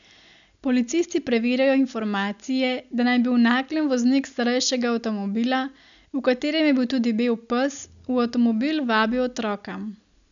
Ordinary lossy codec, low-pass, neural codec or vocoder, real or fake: none; 7.2 kHz; none; real